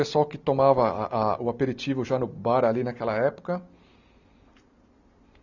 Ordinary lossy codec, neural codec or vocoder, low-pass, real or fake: none; none; 7.2 kHz; real